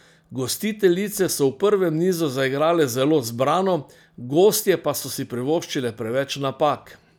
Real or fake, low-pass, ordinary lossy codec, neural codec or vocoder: real; none; none; none